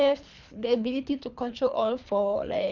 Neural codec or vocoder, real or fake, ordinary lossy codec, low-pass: codec, 24 kHz, 3 kbps, HILCodec; fake; none; 7.2 kHz